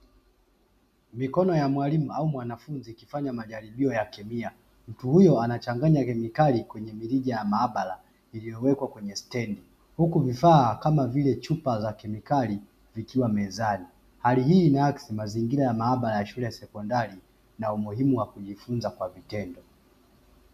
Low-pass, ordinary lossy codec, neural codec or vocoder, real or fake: 14.4 kHz; MP3, 96 kbps; none; real